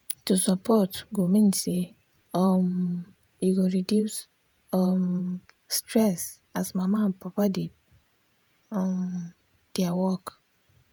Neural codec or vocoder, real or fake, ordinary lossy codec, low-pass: vocoder, 48 kHz, 128 mel bands, Vocos; fake; none; none